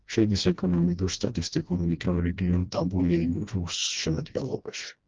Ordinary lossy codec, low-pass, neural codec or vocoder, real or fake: Opus, 32 kbps; 7.2 kHz; codec, 16 kHz, 1 kbps, FreqCodec, smaller model; fake